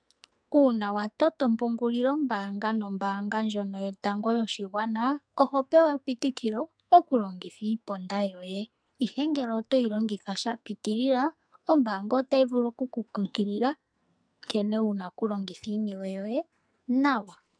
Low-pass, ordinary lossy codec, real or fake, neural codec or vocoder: 9.9 kHz; MP3, 96 kbps; fake; codec, 44.1 kHz, 2.6 kbps, SNAC